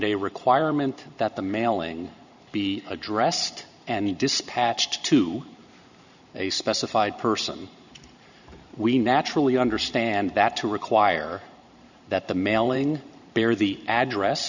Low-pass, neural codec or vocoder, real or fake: 7.2 kHz; vocoder, 44.1 kHz, 128 mel bands every 512 samples, BigVGAN v2; fake